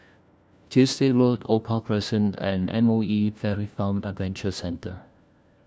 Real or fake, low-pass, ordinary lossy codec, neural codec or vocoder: fake; none; none; codec, 16 kHz, 1 kbps, FunCodec, trained on LibriTTS, 50 frames a second